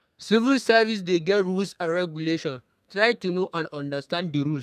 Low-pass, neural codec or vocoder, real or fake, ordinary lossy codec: 14.4 kHz; codec, 32 kHz, 1.9 kbps, SNAC; fake; none